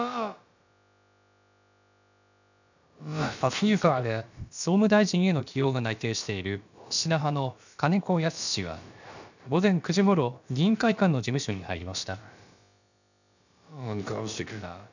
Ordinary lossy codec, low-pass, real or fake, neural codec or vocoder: none; 7.2 kHz; fake; codec, 16 kHz, about 1 kbps, DyCAST, with the encoder's durations